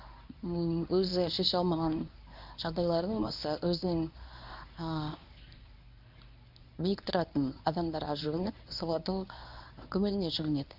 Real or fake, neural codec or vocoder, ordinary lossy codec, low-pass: fake; codec, 24 kHz, 0.9 kbps, WavTokenizer, medium speech release version 1; none; 5.4 kHz